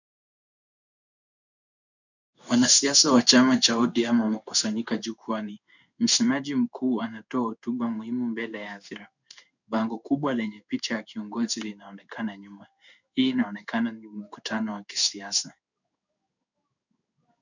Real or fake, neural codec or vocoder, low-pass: fake; codec, 16 kHz in and 24 kHz out, 1 kbps, XY-Tokenizer; 7.2 kHz